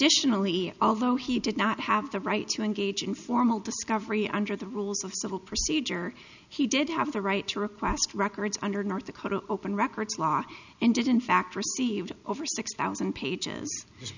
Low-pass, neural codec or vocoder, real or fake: 7.2 kHz; none; real